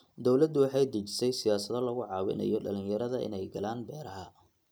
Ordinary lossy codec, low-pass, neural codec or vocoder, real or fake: none; none; none; real